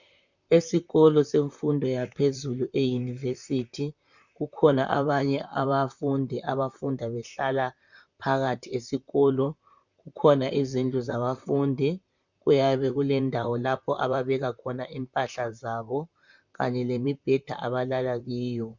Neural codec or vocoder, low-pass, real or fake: vocoder, 44.1 kHz, 128 mel bands, Pupu-Vocoder; 7.2 kHz; fake